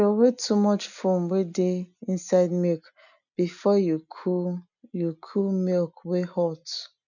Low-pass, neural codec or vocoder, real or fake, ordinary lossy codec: 7.2 kHz; none; real; none